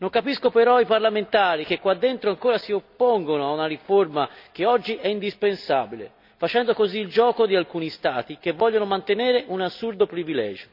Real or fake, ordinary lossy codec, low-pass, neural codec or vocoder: real; none; 5.4 kHz; none